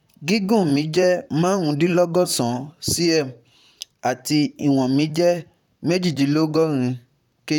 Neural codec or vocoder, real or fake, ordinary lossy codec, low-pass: vocoder, 44.1 kHz, 128 mel bands, Pupu-Vocoder; fake; none; 19.8 kHz